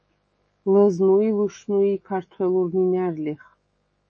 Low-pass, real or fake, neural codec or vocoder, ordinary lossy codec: 9.9 kHz; fake; autoencoder, 48 kHz, 128 numbers a frame, DAC-VAE, trained on Japanese speech; MP3, 32 kbps